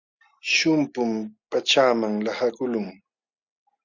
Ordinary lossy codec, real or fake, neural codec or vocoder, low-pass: Opus, 64 kbps; real; none; 7.2 kHz